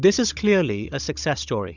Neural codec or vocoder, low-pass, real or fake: codec, 16 kHz, 16 kbps, FunCodec, trained on Chinese and English, 50 frames a second; 7.2 kHz; fake